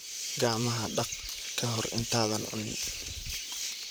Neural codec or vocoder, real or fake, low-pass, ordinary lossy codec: vocoder, 44.1 kHz, 128 mel bands, Pupu-Vocoder; fake; none; none